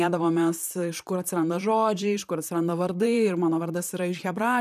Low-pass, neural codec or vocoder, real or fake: 14.4 kHz; vocoder, 44.1 kHz, 128 mel bands, Pupu-Vocoder; fake